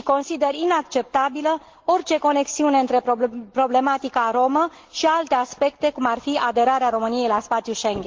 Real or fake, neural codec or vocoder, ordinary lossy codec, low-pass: real; none; Opus, 16 kbps; 7.2 kHz